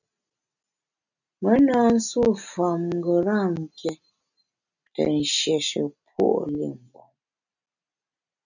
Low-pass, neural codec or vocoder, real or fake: 7.2 kHz; none; real